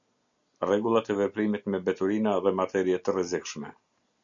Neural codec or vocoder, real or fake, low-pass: none; real; 7.2 kHz